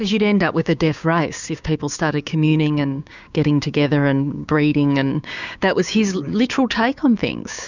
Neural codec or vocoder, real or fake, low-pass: codec, 16 kHz, 6 kbps, DAC; fake; 7.2 kHz